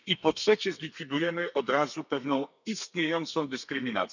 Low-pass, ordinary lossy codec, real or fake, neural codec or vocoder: 7.2 kHz; none; fake; codec, 32 kHz, 1.9 kbps, SNAC